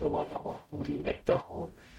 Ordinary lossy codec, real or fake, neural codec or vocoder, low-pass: MP3, 64 kbps; fake; codec, 44.1 kHz, 0.9 kbps, DAC; 19.8 kHz